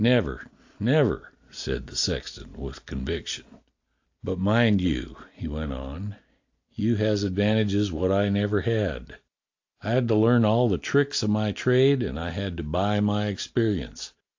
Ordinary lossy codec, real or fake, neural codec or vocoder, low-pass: AAC, 48 kbps; real; none; 7.2 kHz